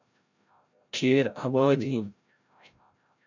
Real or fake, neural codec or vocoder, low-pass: fake; codec, 16 kHz, 0.5 kbps, FreqCodec, larger model; 7.2 kHz